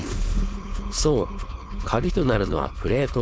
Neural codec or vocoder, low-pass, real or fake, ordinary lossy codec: codec, 16 kHz, 4.8 kbps, FACodec; none; fake; none